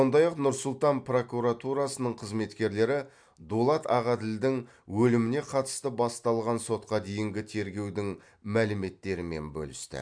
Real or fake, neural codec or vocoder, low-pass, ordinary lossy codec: real; none; 9.9 kHz; MP3, 64 kbps